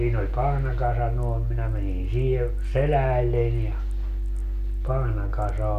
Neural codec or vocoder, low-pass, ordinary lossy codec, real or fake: none; 14.4 kHz; none; real